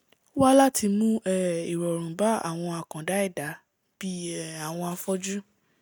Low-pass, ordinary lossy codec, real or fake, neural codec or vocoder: none; none; real; none